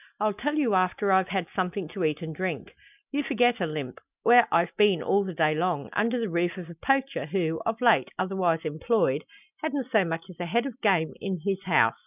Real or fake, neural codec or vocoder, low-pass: real; none; 3.6 kHz